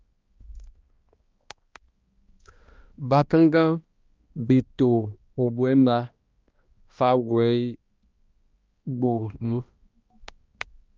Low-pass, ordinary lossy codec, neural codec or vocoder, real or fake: 7.2 kHz; Opus, 24 kbps; codec, 16 kHz, 1 kbps, X-Codec, HuBERT features, trained on balanced general audio; fake